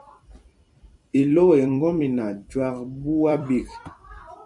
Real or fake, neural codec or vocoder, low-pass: fake; vocoder, 44.1 kHz, 128 mel bands every 256 samples, BigVGAN v2; 10.8 kHz